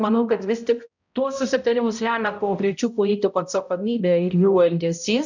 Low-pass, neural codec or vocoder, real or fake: 7.2 kHz; codec, 16 kHz, 0.5 kbps, X-Codec, HuBERT features, trained on balanced general audio; fake